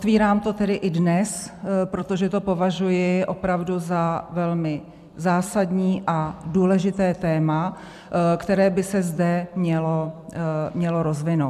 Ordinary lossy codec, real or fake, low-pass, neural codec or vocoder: MP3, 96 kbps; fake; 14.4 kHz; vocoder, 44.1 kHz, 128 mel bands every 256 samples, BigVGAN v2